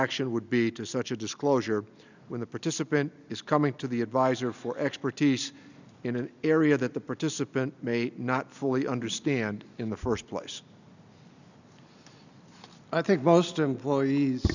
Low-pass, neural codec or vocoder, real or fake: 7.2 kHz; none; real